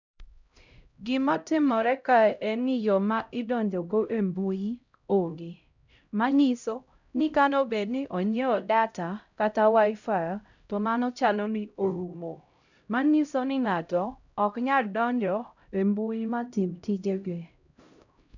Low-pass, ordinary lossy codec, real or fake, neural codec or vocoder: 7.2 kHz; none; fake; codec, 16 kHz, 0.5 kbps, X-Codec, HuBERT features, trained on LibriSpeech